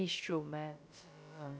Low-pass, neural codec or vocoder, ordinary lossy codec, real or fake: none; codec, 16 kHz, about 1 kbps, DyCAST, with the encoder's durations; none; fake